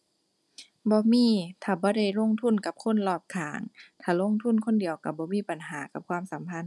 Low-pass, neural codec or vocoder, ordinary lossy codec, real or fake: none; none; none; real